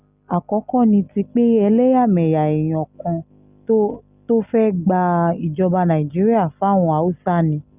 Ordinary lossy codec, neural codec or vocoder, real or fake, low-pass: Opus, 64 kbps; none; real; 3.6 kHz